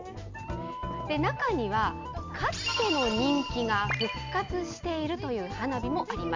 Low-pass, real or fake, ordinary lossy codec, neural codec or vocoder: 7.2 kHz; real; MP3, 64 kbps; none